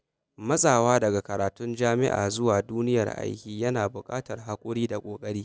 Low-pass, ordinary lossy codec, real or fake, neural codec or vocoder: none; none; real; none